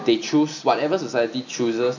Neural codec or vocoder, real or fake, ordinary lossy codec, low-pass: none; real; none; 7.2 kHz